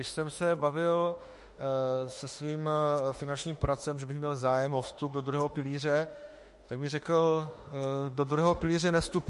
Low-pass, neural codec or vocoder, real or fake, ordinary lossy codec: 14.4 kHz; autoencoder, 48 kHz, 32 numbers a frame, DAC-VAE, trained on Japanese speech; fake; MP3, 48 kbps